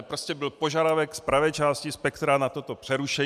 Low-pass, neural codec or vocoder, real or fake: 14.4 kHz; none; real